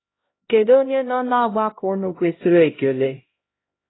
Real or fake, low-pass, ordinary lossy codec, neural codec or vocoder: fake; 7.2 kHz; AAC, 16 kbps; codec, 16 kHz, 0.5 kbps, X-Codec, HuBERT features, trained on LibriSpeech